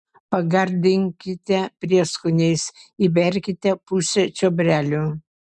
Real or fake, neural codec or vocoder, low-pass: real; none; 10.8 kHz